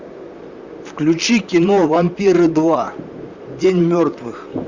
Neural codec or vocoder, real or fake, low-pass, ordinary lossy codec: vocoder, 44.1 kHz, 128 mel bands, Pupu-Vocoder; fake; 7.2 kHz; Opus, 64 kbps